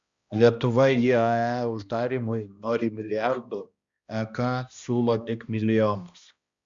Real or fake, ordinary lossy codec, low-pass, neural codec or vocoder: fake; Opus, 64 kbps; 7.2 kHz; codec, 16 kHz, 1 kbps, X-Codec, HuBERT features, trained on balanced general audio